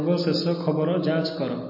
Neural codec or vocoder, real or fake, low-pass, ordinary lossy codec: none; real; 5.4 kHz; MP3, 24 kbps